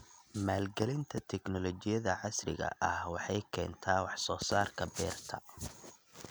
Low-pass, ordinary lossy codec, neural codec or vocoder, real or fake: none; none; none; real